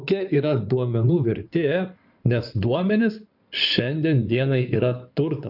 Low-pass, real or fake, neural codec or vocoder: 5.4 kHz; fake; codec, 16 kHz in and 24 kHz out, 2.2 kbps, FireRedTTS-2 codec